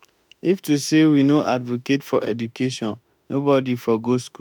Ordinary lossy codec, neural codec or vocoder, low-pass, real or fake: none; autoencoder, 48 kHz, 32 numbers a frame, DAC-VAE, trained on Japanese speech; 19.8 kHz; fake